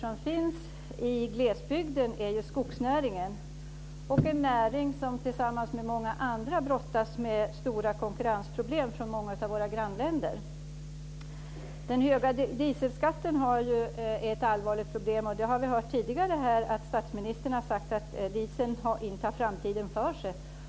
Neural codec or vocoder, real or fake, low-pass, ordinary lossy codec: none; real; none; none